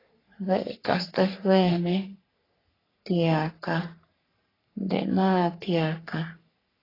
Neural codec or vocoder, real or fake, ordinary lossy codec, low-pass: codec, 44.1 kHz, 3.4 kbps, Pupu-Codec; fake; AAC, 24 kbps; 5.4 kHz